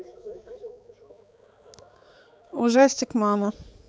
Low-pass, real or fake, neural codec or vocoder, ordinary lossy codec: none; fake; codec, 16 kHz, 4 kbps, X-Codec, HuBERT features, trained on balanced general audio; none